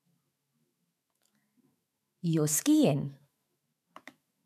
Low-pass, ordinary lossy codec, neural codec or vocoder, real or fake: 14.4 kHz; none; autoencoder, 48 kHz, 128 numbers a frame, DAC-VAE, trained on Japanese speech; fake